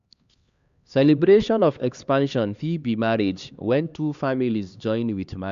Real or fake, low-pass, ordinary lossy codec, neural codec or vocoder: fake; 7.2 kHz; Opus, 64 kbps; codec, 16 kHz, 2 kbps, X-Codec, HuBERT features, trained on LibriSpeech